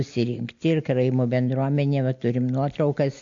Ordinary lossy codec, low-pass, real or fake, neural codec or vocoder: MP3, 48 kbps; 7.2 kHz; real; none